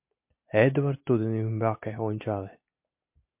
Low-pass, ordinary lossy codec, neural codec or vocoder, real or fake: 3.6 kHz; MP3, 32 kbps; none; real